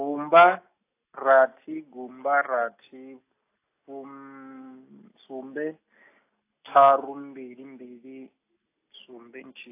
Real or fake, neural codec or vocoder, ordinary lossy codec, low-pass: real; none; AAC, 24 kbps; 3.6 kHz